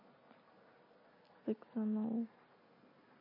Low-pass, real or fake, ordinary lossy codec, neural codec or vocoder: 5.4 kHz; real; MP3, 24 kbps; none